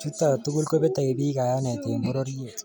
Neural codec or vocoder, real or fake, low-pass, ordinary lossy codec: none; real; none; none